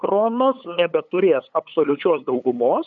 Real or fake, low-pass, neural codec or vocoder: fake; 7.2 kHz; codec, 16 kHz, 8 kbps, FunCodec, trained on LibriTTS, 25 frames a second